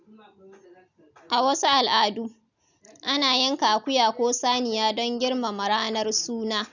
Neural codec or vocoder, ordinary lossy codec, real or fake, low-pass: none; none; real; 7.2 kHz